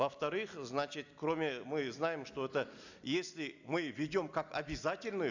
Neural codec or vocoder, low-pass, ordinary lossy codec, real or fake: none; 7.2 kHz; none; real